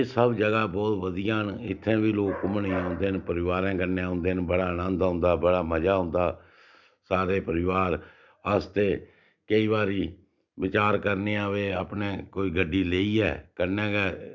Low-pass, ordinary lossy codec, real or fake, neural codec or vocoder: 7.2 kHz; none; real; none